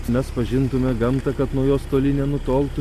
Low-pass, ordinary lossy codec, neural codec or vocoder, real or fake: 14.4 kHz; MP3, 64 kbps; none; real